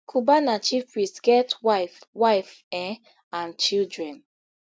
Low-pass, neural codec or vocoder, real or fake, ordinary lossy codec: none; none; real; none